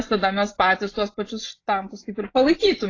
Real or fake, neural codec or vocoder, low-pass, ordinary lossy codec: fake; vocoder, 24 kHz, 100 mel bands, Vocos; 7.2 kHz; AAC, 32 kbps